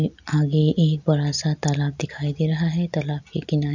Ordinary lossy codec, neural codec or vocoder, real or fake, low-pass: none; none; real; 7.2 kHz